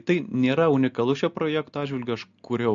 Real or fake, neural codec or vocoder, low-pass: real; none; 7.2 kHz